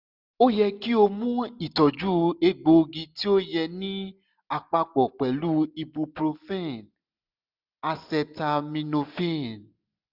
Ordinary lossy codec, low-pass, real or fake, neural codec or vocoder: none; 5.4 kHz; real; none